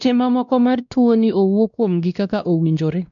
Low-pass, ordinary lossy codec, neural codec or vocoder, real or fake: 7.2 kHz; none; codec, 16 kHz, 1 kbps, X-Codec, WavLM features, trained on Multilingual LibriSpeech; fake